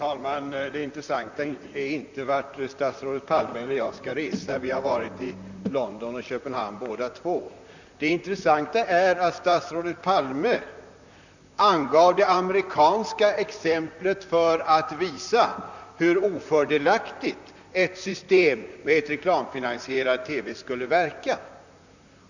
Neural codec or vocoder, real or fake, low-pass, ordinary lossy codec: vocoder, 44.1 kHz, 128 mel bands, Pupu-Vocoder; fake; 7.2 kHz; none